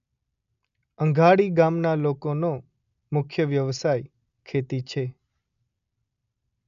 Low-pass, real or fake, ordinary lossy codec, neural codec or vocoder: 7.2 kHz; real; none; none